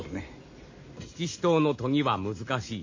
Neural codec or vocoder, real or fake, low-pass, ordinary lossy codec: none; real; 7.2 kHz; MP3, 32 kbps